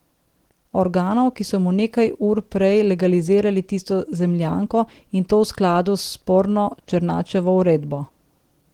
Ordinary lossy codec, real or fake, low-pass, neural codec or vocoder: Opus, 16 kbps; real; 19.8 kHz; none